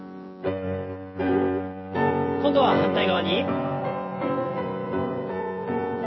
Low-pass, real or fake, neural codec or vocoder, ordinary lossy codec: 7.2 kHz; fake; vocoder, 24 kHz, 100 mel bands, Vocos; MP3, 24 kbps